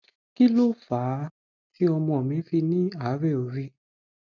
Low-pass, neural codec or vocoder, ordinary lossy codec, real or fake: 7.2 kHz; none; none; real